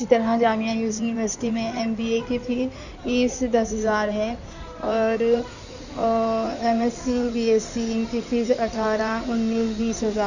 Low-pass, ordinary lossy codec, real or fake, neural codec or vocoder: 7.2 kHz; none; fake; codec, 16 kHz in and 24 kHz out, 2.2 kbps, FireRedTTS-2 codec